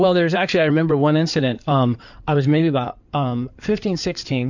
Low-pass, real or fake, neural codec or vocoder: 7.2 kHz; fake; codec, 16 kHz in and 24 kHz out, 2.2 kbps, FireRedTTS-2 codec